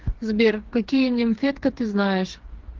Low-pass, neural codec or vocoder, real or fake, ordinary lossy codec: 7.2 kHz; codec, 16 kHz, 4 kbps, FreqCodec, smaller model; fake; Opus, 16 kbps